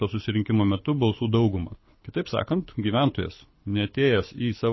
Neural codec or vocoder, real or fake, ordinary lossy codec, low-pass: codec, 24 kHz, 6 kbps, HILCodec; fake; MP3, 24 kbps; 7.2 kHz